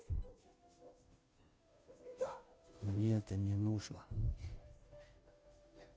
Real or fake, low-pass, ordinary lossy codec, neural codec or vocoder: fake; none; none; codec, 16 kHz, 0.5 kbps, FunCodec, trained on Chinese and English, 25 frames a second